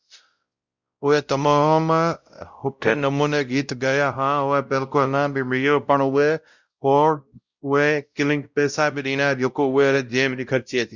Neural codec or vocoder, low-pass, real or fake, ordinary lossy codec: codec, 16 kHz, 0.5 kbps, X-Codec, WavLM features, trained on Multilingual LibriSpeech; 7.2 kHz; fake; Opus, 64 kbps